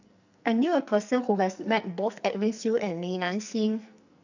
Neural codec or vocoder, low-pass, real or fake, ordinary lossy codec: codec, 44.1 kHz, 2.6 kbps, SNAC; 7.2 kHz; fake; none